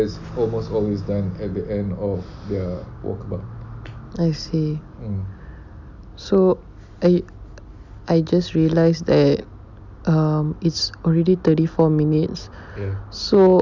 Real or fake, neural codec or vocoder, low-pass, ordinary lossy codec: real; none; 7.2 kHz; none